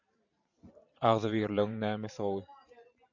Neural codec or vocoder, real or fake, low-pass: vocoder, 44.1 kHz, 128 mel bands every 512 samples, BigVGAN v2; fake; 7.2 kHz